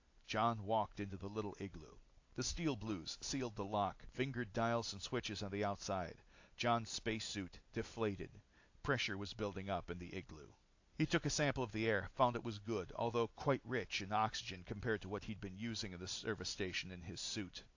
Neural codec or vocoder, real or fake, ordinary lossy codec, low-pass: none; real; AAC, 48 kbps; 7.2 kHz